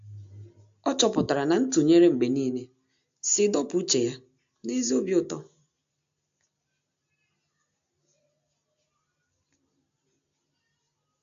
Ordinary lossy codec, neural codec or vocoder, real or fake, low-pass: AAC, 64 kbps; none; real; 7.2 kHz